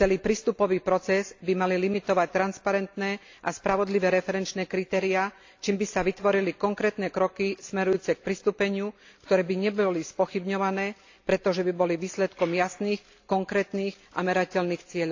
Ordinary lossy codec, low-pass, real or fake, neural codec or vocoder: AAC, 48 kbps; 7.2 kHz; real; none